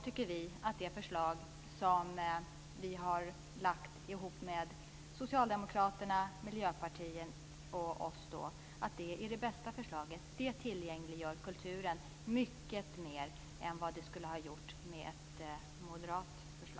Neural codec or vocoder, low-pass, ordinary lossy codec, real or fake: none; none; none; real